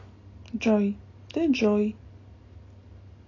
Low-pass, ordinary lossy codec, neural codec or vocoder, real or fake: 7.2 kHz; AAC, 32 kbps; none; real